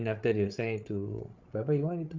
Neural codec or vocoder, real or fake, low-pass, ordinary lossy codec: codec, 16 kHz, 16 kbps, FreqCodec, larger model; fake; 7.2 kHz; Opus, 24 kbps